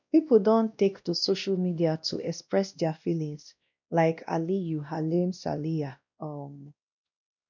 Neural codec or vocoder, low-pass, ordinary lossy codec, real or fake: codec, 16 kHz, 1 kbps, X-Codec, WavLM features, trained on Multilingual LibriSpeech; 7.2 kHz; none; fake